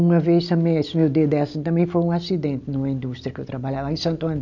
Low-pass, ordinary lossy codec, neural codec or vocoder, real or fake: 7.2 kHz; none; none; real